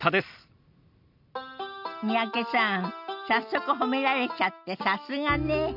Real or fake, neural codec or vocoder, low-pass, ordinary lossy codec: real; none; 5.4 kHz; none